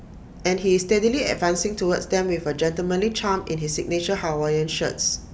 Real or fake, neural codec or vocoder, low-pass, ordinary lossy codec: real; none; none; none